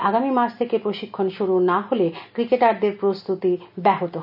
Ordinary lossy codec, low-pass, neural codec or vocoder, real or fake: none; 5.4 kHz; none; real